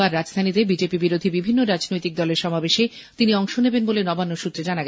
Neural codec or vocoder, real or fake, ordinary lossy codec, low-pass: none; real; none; none